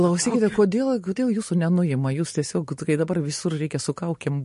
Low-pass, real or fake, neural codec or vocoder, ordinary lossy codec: 10.8 kHz; real; none; MP3, 48 kbps